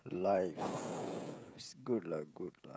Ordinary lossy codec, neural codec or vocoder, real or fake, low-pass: none; codec, 16 kHz, 16 kbps, FreqCodec, smaller model; fake; none